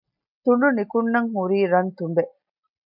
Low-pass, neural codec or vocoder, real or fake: 5.4 kHz; none; real